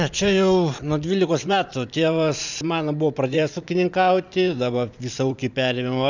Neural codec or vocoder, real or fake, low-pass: vocoder, 44.1 kHz, 128 mel bands every 512 samples, BigVGAN v2; fake; 7.2 kHz